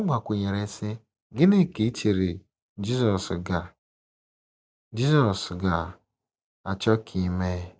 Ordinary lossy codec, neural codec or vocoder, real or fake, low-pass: none; none; real; none